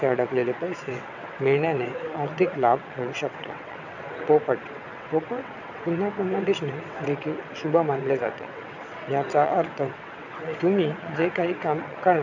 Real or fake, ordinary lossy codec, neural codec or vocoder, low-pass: fake; none; vocoder, 22.05 kHz, 80 mel bands, WaveNeXt; 7.2 kHz